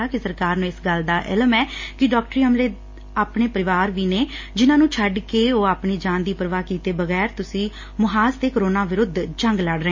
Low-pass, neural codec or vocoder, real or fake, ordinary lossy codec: 7.2 kHz; none; real; MP3, 32 kbps